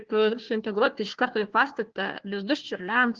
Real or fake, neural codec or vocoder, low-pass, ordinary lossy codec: fake; codec, 16 kHz, 1 kbps, FunCodec, trained on Chinese and English, 50 frames a second; 7.2 kHz; Opus, 16 kbps